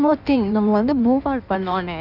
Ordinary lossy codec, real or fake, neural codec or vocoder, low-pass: none; fake; codec, 16 kHz in and 24 kHz out, 1.1 kbps, FireRedTTS-2 codec; 5.4 kHz